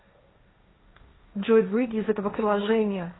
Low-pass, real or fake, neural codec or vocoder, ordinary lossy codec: 7.2 kHz; fake; codec, 16 kHz, 1.1 kbps, Voila-Tokenizer; AAC, 16 kbps